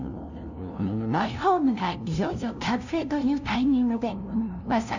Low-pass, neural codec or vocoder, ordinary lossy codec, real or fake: 7.2 kHz; codec, 16 kHz, 0.5 kbps, FunCodec, trained on LibriTTS, 25 frames a second; none; fake